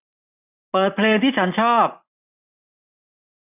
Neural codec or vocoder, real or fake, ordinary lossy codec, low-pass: vocoder, 44.1 kHz, 128 mel bands every 256 samples, BigVGAN v2; fake; none; 3.6 kHz